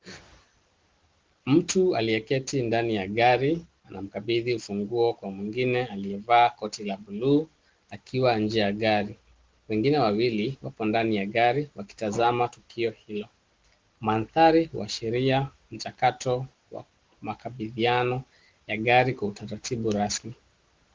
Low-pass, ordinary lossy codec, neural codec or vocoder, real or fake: 7.2 kHz; Opus, 16 kbps; none; real